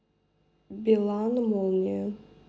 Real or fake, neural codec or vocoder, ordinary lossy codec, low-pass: real; none; none; none